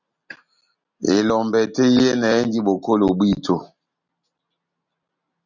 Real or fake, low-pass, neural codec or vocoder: real; 7.2 kHz; none